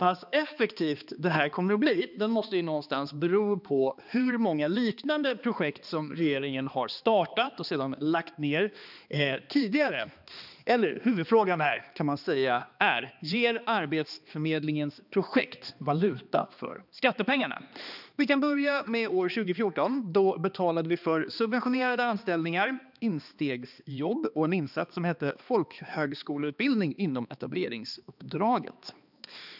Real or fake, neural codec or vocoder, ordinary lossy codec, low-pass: fake; codec, 16 kHz, 2 kbps, X-Codec, HuBERT features, trained on balanced general audio; none; 5.4 kHz